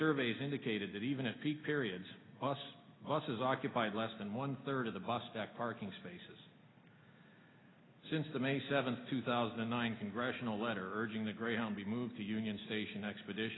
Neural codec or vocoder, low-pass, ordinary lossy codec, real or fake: none; 7.2 kHz; AAC, 16 kbps; real